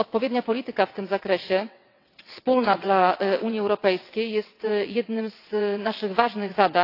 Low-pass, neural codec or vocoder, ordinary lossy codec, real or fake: 5.4 kHz; vocoder, 22.05 kHz, 80 mel bands, WaveNeXt; AAC, 32 kbps; fake